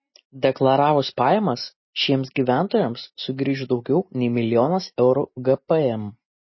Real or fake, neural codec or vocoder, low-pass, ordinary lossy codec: real; none; 7.2 kHz; MP3, 24 kbps